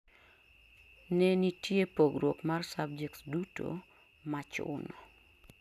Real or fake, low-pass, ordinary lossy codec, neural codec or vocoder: real; 14.4 kHz; none; none